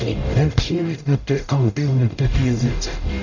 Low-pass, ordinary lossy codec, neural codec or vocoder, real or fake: 7.2 kHz; none; codec, 44.1 kHz, 0.9 kbps, DAC; fake